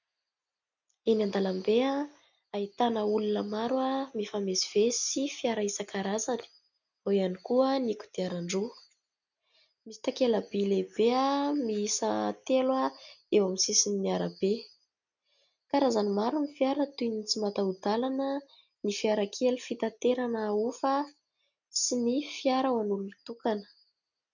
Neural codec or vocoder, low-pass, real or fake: none; 7.2 kHz; real